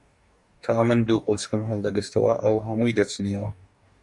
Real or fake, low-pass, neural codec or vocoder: fake; 10.8 kHz; codec, 44.1 kHz, 2.6 kbps, DAC